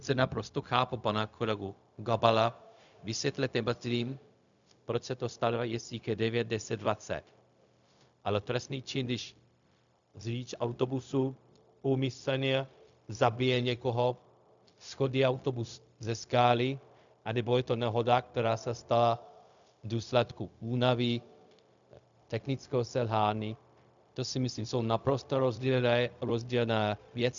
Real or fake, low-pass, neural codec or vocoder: fake; 7.2 kHz; codec, 16 kHz, 0.4 kbps, LongCat-Audio-Codec